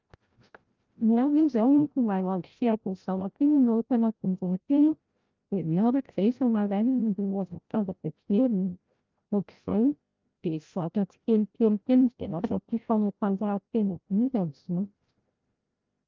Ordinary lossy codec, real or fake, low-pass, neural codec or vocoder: Opus, 24 kbps; fake; 7.2 kHz; codec, 16 kHz, 0.5 kbps, FreqCodec, larger model